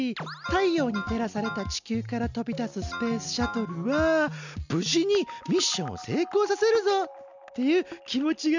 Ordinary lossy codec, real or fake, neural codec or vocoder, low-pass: none; real; none; 7.2 kHz